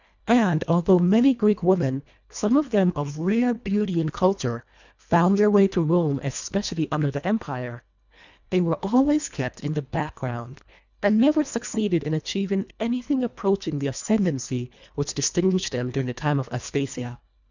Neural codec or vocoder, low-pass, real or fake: codec, 24 kHz, 1.5 kbps, HILCodec; 7.2 kHz; fake